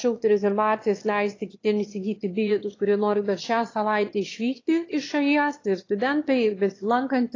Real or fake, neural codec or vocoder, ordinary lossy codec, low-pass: fake; autoencoder, 22.05 kHz, a latent of 192 numbers a frame, VITS, trained on one speaker; AAC, 32 kbps; 7.2 kHz